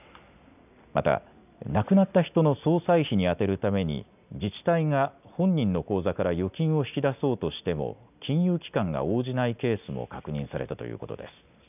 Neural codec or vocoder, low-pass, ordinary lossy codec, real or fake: none; 3.6 kHz; none; real